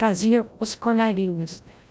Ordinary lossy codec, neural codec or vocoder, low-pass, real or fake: none; codec, 16 kHz, 0.5 kbps, FreqCodec, larger model; none; fake